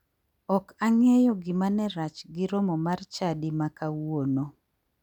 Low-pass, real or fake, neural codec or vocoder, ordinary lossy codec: 19.8 kHz; real; none; none